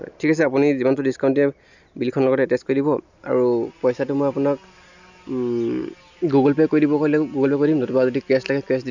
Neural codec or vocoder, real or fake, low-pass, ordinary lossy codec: none; real; 7.2 kHz; none